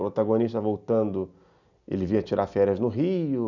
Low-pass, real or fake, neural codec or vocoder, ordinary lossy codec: 7.2 kHz; real; none; none